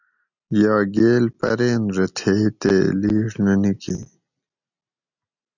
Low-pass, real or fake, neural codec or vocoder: 7.2 kHz; real; none